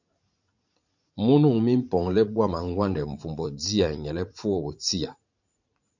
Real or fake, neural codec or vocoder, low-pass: fake; vocoder, 44.1 kHz, 80 mel bands, Vocos; 7.2 kHz